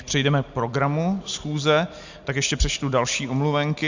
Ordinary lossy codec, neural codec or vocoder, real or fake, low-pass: Opus, 64 kbps; none; real; 7.2 kHz